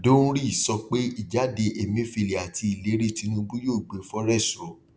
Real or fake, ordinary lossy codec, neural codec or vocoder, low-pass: real; none; none; none